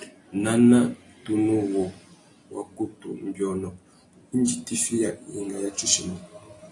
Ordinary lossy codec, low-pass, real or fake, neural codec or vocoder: AAC, 48 kbps; 10.8 kHz; real; none